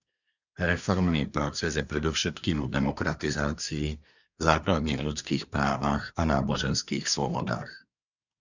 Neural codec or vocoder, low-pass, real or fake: codec, 24 kHz, 1 kbps, SNAC; 7.2 kHz; fake